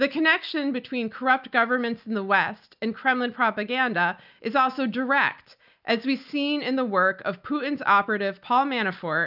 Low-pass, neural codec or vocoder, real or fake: 5.4 kHz; none; real